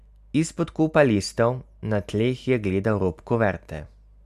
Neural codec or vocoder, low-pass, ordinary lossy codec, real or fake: none; 14.4 kHz; none; real